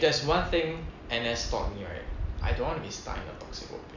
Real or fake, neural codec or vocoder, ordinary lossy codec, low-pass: real; none; none; 7.2 kHz